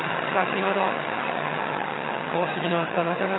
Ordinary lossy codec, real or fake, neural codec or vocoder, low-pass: AAC, 16 kbps; fake; vocoder, 22.05 kHz, 80 mel bands, HiFi-GAN; 7.2 kHz